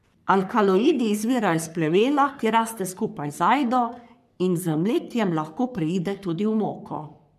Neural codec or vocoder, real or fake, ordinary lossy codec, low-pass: codec, 44.1 kHz, 3.4 kbps, Pupu-Codec; fake; none; 14.4 kHz